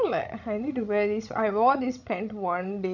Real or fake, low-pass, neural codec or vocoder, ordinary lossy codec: fake; 7.2 kHz; codec, 16 kHz, 16 kbps, FreqCodec, larger model; none